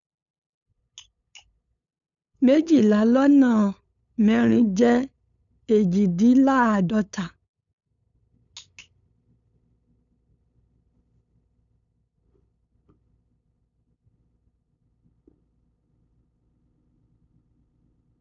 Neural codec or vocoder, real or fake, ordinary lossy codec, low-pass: codec, 16 kHz, 8 kbps, FunCodec, trained on LibriTTS, 25 frames a second; fake; none; 7.2 kHz